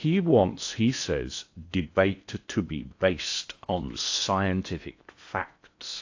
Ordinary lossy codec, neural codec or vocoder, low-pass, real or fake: AAC, 48 kbps; codec, 16 kHz in and 24 kHz out, 0.8 kbps, FocalCodec, streaming, 65536 codes; 7.2 kHz; fake